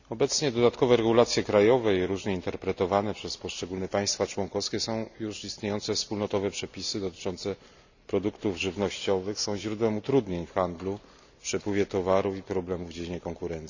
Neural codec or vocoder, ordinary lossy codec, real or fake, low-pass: none; none; real; 7.2 kHz